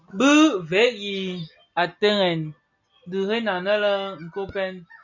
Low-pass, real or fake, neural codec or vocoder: 7.2 kHz; real; none